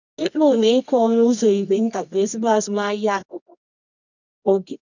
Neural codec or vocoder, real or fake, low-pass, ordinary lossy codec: codec, 24 kHz, 0.9 kbps, WavTokenizer, medium music audio release; fake; 7.2 kHz; none